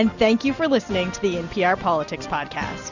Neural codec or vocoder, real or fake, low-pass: none; real; 7.2 kHz